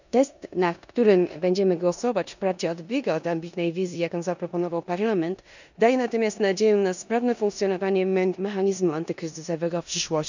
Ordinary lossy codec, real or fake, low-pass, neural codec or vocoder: none; fake; 7.2 kHz; codec, 16 kHz in and 24 kHz out, 0.9 kbps, LongCat-Audio-Codec, four codebook decoder